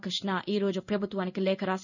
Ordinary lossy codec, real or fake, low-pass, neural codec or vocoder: none; fake; 7.2 kHz; codec, 16 kHz in and 24 kHz out, 1 kbps, XY-Tokenizer